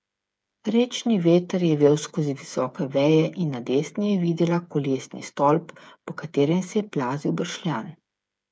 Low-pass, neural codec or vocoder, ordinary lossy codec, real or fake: none; codec, 16 kHz, 8 kbps, FreqCodec, smaller model; none; fake